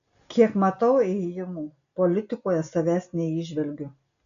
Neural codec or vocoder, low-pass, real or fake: none; 7.2 kHz; real